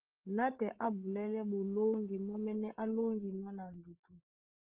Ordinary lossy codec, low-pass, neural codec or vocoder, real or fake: Opus, 16 kbps; 3.6 kHz; codec, 16 kHz, 16 kbps, FreqCodec, larger model; fake